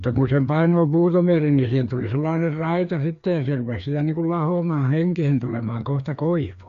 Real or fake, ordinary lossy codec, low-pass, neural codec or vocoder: fake; AAC, 64 kbps; 7.2 kHz; codec, 16 kHz, 2 kbps, FreqCodec, larger model